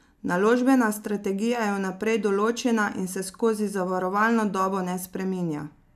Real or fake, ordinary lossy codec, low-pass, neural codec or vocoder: real; none; 14.4 kHz; none